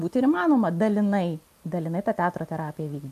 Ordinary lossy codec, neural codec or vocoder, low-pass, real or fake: MP3, 64 kbps; none; 14.4 kHz; real